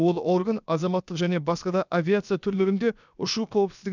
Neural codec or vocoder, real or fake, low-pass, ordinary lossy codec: codec, 16 kHz, about 1 kbps, DyCAST, with the encoder's durations; fake; 7.2 kHz; none